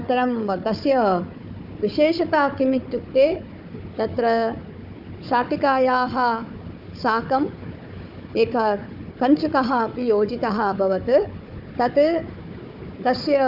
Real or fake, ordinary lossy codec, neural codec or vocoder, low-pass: fake; none; codec, 16 kHz, 4 kbps, FunCodec, trained on Chinese and English, 50 frames a second; 5.4 kHz